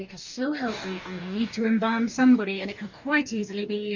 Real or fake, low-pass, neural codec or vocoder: fake; 7.2 kHz; codec, 44.1 kHz, 2.6 kbps, DAC